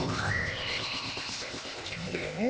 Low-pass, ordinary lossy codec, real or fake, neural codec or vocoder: none; none; fake; codec, 16 kHz, 0.8 kbps, ZipCodec